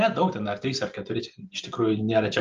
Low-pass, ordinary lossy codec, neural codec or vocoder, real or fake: 7.2 kHz; Opus, 24 kbps; none; real